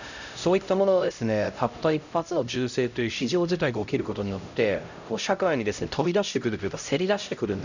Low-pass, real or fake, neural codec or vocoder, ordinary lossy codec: 7.2 kHz; fake; codec, 16 kHz, 0.5 kbps, X-Codec, HuBERT features, trained on LibriSpeech; none